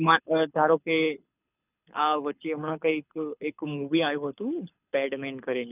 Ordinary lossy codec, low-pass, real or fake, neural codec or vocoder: none; 3.6 kHz; fake; codec, 24 kHz, 6 kbps, HILCodec